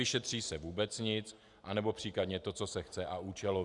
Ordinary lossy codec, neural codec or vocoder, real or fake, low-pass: Opus, 64 kbps; none; real; 10.8 kHz